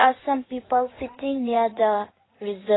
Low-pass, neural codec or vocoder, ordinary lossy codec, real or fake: 7.2 kHz; codec, 16 kHz in and 24 kHz out, 2.2 kbps, FireRedTTS-2 codec; AAC, 16 kbps; fake